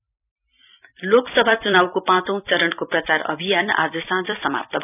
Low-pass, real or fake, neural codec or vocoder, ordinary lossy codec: 3.6 kHz; fake; vocoder, 44.1 kHz, 128 mel bands every 256 samples, BigVGAN v2; none